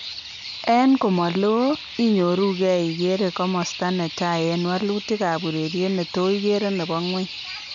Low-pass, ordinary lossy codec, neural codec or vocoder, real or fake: 7.2 kHz; none; none; real